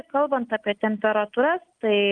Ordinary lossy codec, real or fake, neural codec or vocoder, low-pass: Opus, 32 kbps; real; none; 9.9 kHz